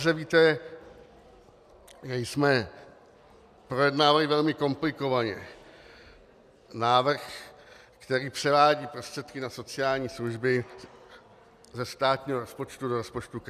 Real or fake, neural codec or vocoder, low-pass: real; none; 14.4 kHz